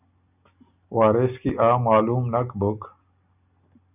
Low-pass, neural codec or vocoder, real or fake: 3.6 kHz; none; real